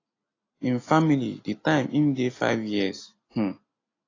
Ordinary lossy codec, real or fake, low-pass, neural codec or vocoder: AAC, 32 kbps; real; 7.2 kHz; none